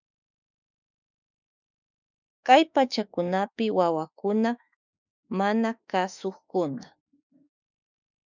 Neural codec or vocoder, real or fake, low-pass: autoencoder, 48 kHz, 32 numbers a frame, DAC-VAE, trained on Japanese speech; fake; 7.2 kHz